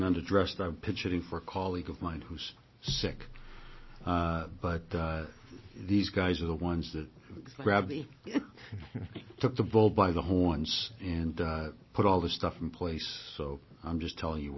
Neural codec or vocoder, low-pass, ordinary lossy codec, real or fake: none; 7.2 kHz; MP3, 24 kbps; real